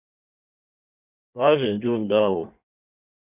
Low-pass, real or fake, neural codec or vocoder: 3.6 kHz; fake; codec, 16 kHz in and 24 kHz out, 1.1 kbps, FireRedTTS-2 codec